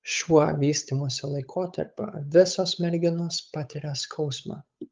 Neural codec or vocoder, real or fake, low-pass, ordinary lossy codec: codec, 16 kHz, 8 kbps, FunCodec, trained on Chinese and English, 25 frames a second; fake; 7.2 kHz; Opus, 32 kbps